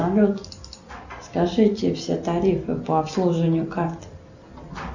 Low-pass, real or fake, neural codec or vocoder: 7.2 kHz; real; none